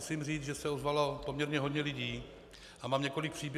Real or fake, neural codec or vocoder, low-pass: real; none; 14.4 kHz